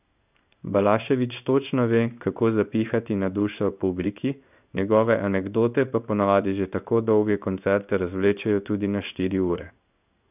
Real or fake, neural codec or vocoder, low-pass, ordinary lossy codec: fake; codec, 16 kHz in and 24 kHz out, 1 kbps, XY-Tokenizer; 3.6 kHz; none